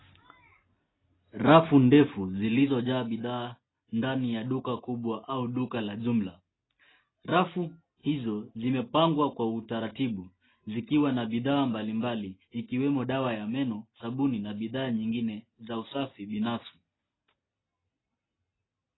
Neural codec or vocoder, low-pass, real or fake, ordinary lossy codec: none; 7.2 kHz; real; AAC, 16 kbps